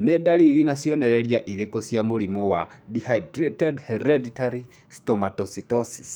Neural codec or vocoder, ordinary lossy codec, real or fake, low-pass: codec, 44.1 kHz, 2.6 kbps, SNAC; none; fake; none